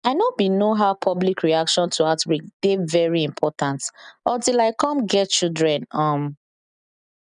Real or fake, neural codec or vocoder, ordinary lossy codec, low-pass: real; none; none; 9.9 kHz